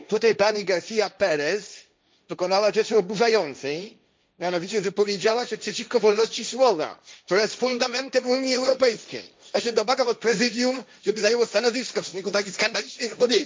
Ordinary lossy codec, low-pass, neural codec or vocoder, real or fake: none; none; codec, 16 kHz, 1.1 kbps, Voila-Tokenizer; fake